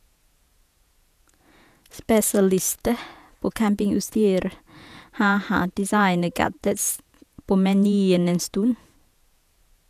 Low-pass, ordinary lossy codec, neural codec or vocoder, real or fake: 14.4 kHz; none; vocoder, 48 kHz, 128 mel bands, Vocos; fake